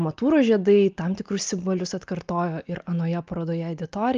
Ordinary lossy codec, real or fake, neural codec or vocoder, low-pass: Opus, 24 kbps; real; none; 7.2 kHz